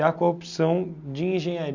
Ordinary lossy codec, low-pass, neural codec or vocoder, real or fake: none; 7.2 kHz; none; real